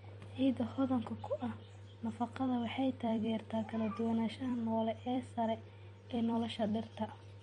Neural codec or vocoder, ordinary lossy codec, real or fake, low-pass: vocoder, 44.1 kHz, 128 mel bands every 256 samples, BigVGAN v2; MP3, 48 kbps; fake; 19.8 kHz